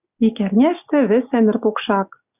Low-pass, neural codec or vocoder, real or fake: 3.6 kHz; none; real